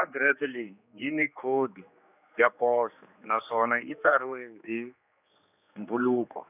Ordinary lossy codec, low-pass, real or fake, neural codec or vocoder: none; 3.6 kHz; fake; codec, 16 kHz, 2 kbps, X-Codec, HuBERT features, trained on general audio